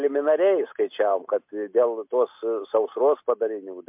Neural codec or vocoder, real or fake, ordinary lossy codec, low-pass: none; real; MP3, 32 kbps; 3.6 kHz